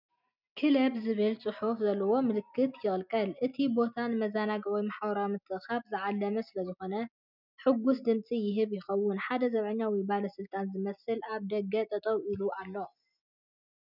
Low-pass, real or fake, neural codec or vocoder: 5.4 kHz; real; none